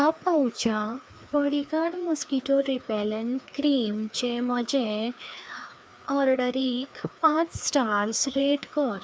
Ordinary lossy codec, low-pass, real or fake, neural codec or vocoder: none; none; fake; codec, 16 kHz, 2 kbps, FreqCodec, larger model